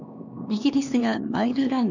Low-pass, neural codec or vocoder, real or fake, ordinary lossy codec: 7.2 kHz; codec, 16 kHz, 2 kbps, X-Codec, HuBERT features, trained on LibriSpeech; fake; none